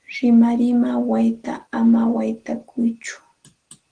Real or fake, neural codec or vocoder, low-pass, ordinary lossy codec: real; none; 9.9 kHz; Opus, 16 kbps